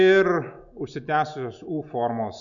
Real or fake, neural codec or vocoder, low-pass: real; none; 7.2 kHz